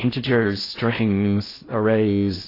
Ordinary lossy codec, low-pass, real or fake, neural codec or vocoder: AAC, 24 kbps; 5.4 kHz; fake; codec, 16 kHz in and 24 kHz out, 0.6 kbps, FocalCodec, streaming, 4096 codes